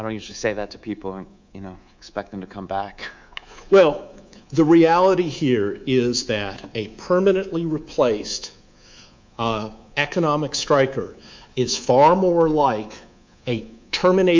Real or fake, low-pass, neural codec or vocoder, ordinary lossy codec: fake; 7.2 kHz; codec, 24 kHz, 3.1 kbps, DualCodec; MP3, 64 kbps